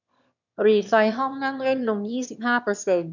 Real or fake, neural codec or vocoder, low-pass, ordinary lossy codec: fake; autoencoder, 22.05 kHz, a latent of 192 numbers a frame, VITS, trained on one speaker; 7.2 kHz; none